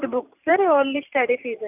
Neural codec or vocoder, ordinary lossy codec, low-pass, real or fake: vocoder, 44.1 kHz, 128 mel bands every 512 samples, BigVGAN v2; none; 3.6 kHz; fake